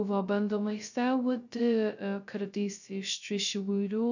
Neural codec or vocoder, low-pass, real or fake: codec, 16 kHz, 0.2 kbps, FocalCodec; 7.2 kHz; fake